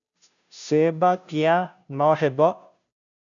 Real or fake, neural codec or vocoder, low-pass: fake; codec, 16 kHz, 0.5 kbps, FunCodec, trained on Chinese and English, 25 frames a second; 7.2 kHz